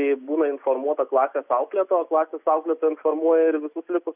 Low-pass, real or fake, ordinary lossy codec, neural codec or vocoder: 3.6 kHz; real; Opus, 64 kbps; none